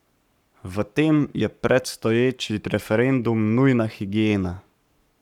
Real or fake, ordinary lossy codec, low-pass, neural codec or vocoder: fake; none; 19.8 kHz; codec, 44.1 kHz, 7.8 kbps, Pupu-Codec